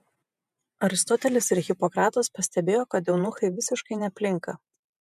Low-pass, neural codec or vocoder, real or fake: 14.4 kHz; vocoder, 48 kHz, 128 mel bands, Vocos; fake